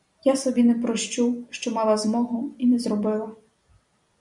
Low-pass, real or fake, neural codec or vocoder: 10.8 kHz; real; none